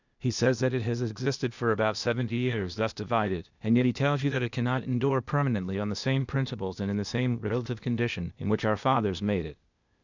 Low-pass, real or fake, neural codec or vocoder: 7.2 kHz; fake; codec, 16 kHz, 0.8 kbps, ZipCodec